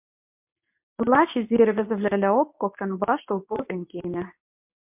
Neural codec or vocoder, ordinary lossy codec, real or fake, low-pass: codec, 24 kHz, 0.9 kbps, WavTokenizer, medium speech release version 2; MP3, 32 kbps; fake; 3.6 kHz